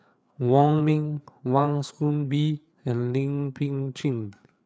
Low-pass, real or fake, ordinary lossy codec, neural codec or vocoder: none; fake; none; codec, 16 kHz, 4 kbps, FreqCodec, larger model